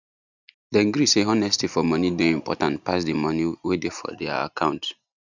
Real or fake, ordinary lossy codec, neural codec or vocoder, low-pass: real; none; none; 7.2 kHz